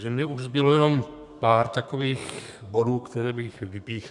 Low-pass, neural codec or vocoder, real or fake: 10.8 kHz; codec, 32 kHz, 1.9 kbps, SNAC; fake